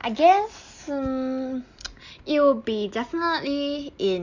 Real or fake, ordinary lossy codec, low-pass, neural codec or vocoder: real; Opus, 64 kbps; 7.2 kHz; none